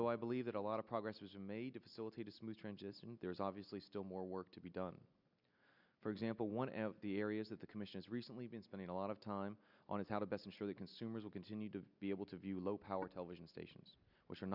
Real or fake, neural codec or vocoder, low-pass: real; none; 5.4 kHz